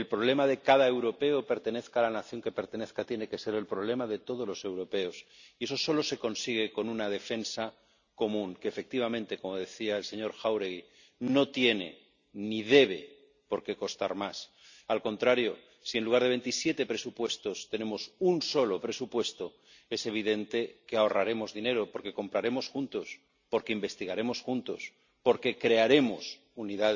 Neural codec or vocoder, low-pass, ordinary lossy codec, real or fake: none; 7.2 kHz; none; real